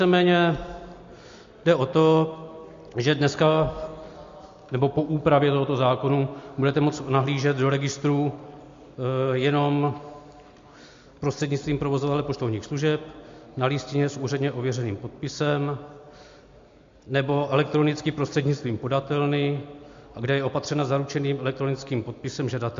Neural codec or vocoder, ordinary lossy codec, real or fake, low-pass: none; MP3, 48 kbps; real; 7.2 kHz